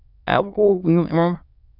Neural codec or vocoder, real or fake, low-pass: autoencoder, 22.05 kHz, a latent of 192 numbers a frame, VITS, trained on many speakers; fake; 5.4 kHz